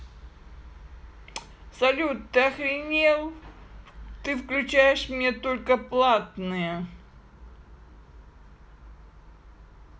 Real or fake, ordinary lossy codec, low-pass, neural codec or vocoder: real; none; none; none